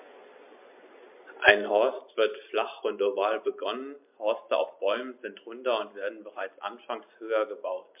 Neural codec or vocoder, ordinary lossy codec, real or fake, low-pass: none; MP3, 32 kbps; real; 3.6 kHz